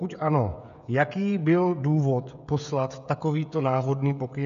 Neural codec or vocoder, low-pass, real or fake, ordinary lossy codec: codec, 16 kHz, 16 kbps, FreqCodec, smaller model; 7.2 kHz; fake; AAC, 96 kbps